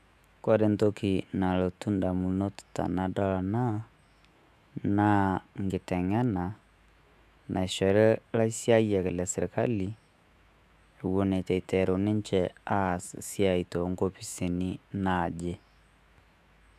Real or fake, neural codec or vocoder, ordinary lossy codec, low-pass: fake; autoencoder, 48 kHz, 128 numbers a frame, DAC-VAE, trained on Japanese speech; none; 14.4 kHz